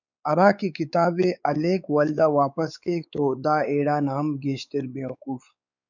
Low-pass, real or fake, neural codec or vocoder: 7.2 kHz; fake; codec, 16 kHz, 4 kbps, X-Codec, WavLM features, trained on Multilingual LibriSpeech